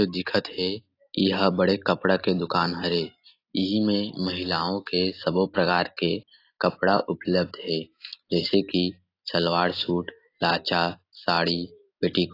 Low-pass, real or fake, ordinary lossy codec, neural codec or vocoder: 5.4 kHz; real; AAC, 32 kbps; none